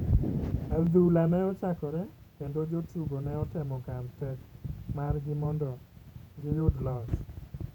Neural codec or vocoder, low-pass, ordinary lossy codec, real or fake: vocoder, 48 kHz, 128 mel bands, Vocos; 19.8 kHz; none; fake